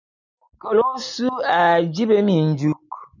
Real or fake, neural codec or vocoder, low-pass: real; none; 7.2 kHz